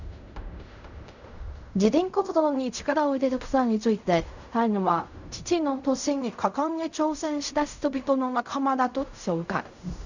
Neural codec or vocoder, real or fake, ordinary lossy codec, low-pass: codec, 16 kHz in and 24 kHz out, 0.4 kbps, LongCat-Audio-Codec, fine tuned four codebook decoder; fake; none; 7.2 kHz